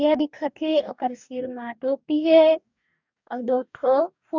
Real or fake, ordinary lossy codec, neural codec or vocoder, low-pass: fake; none; codec, 44.1 kHz, 2.6 kbps, DAC; 7.2 kHz